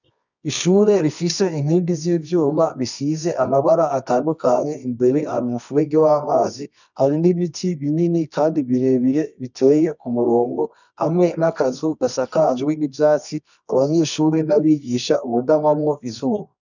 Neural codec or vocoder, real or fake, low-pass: codec, 24 kHz, 0.9 kbps, WavTokenizer, medium music audio release; fake; 7.2 kHz